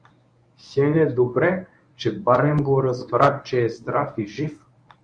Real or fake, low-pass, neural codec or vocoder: fake; 9.9 kHz; codec, 24 kHz, 0.9 kbps, WavTokenizer, medium speech release version 1